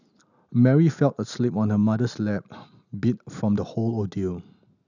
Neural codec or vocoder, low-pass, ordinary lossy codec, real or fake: none; 7.2 kHz; none; real